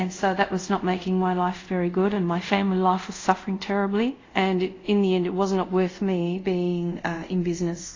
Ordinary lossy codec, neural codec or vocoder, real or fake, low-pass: AAC, 32 kbps; codec, 24 kHz, 0.5 kbps, DualCodec; fake; 7.2 kHz